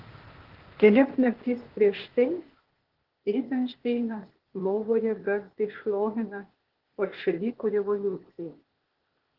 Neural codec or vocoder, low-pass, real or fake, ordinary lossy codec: codec, 16 kHz, 0.8 kbps, ZipCodec; 5.4 kHz; fake; Opus, 16 kbps